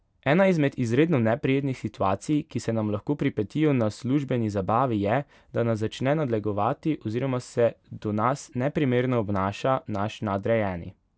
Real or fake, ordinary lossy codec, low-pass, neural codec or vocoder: real; none; none; none